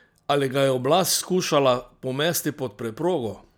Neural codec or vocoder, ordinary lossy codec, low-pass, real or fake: none; none; none; real